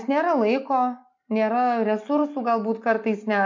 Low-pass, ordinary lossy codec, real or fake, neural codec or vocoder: 7.2 kHz; MP3, 48 kbps; real; none